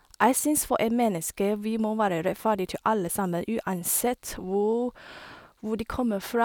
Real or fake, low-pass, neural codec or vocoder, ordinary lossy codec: real; none; none; none